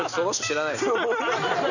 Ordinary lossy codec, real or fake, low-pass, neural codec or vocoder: none; real; 7.2 kHz; none